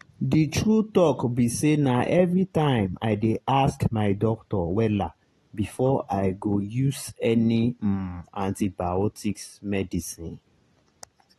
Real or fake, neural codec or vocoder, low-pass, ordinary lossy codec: real; none; 19.8 kHz; AAC, 32 kbps